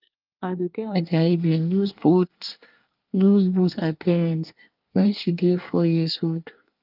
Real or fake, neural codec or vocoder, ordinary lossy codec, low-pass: fake; codec, 24 kHz, 1 kbps, SNAC; Opus, 24 kbps; 5.4 kHz